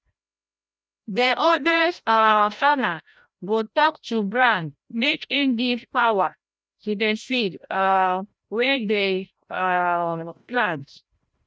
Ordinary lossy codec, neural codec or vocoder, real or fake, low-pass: none; codec, 16 kHz, 0.5 kbps, FreqCodec, larger model; fake; none